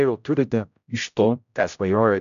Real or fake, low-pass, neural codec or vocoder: fake; 7.2 kHz; codec, 16 kHz, 0.5 kbps, X-Codec, HuBERT features, trained on general audio